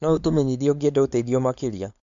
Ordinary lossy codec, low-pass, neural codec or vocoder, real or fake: MP3, 64 kbps; 7.2 kHz; codec, 16 kHz, 4.8 kbps, FACodec; fake